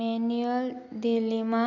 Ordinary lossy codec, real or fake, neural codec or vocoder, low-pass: none; real; none; 7.2 kHz